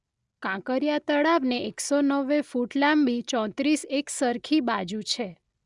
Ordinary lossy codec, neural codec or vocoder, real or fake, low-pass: Opus, 64 kbps; none; real; 10.8 kHz